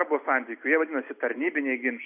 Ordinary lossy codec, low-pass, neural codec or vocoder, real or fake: AAC, 32 kbps; 3.6 kHz; none; real